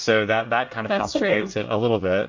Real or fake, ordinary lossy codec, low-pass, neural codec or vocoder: fake; MP3, 48 kbps; 7.2 kHz; codec, 24 kHz, 1 kbps, SNAC